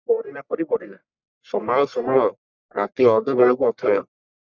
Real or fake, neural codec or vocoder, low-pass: fake; codec, 44.1 kHz, 1.7 kbps, Pupu-Codec; 7.2 kHz